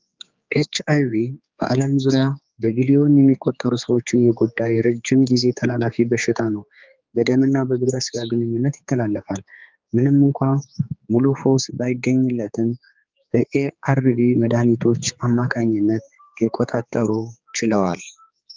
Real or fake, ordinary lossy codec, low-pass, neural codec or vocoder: fake; Opus, 24 kbps; 7.2 kHz; codec, 16 kHz, 4 kbps, X-Codec, HuBERT features, trained on general audio